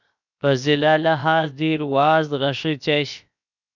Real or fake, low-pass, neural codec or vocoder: fake; 7.2 kHz; codec, 16 kHz, 0.7 kbps, FocalCodec